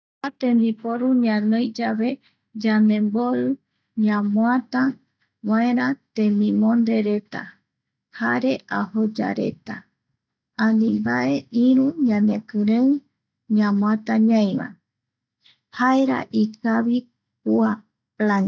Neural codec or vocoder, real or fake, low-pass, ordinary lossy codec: none; real; none; none